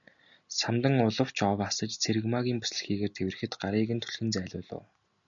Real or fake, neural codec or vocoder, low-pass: real; none; 7.2 kHz